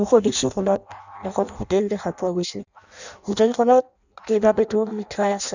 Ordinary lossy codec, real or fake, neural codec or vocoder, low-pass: none; fake; codec, 16 kHz in and 24 kHz out, 0.6 kbps, FireRedTTS-2 codec; 7.2 kHz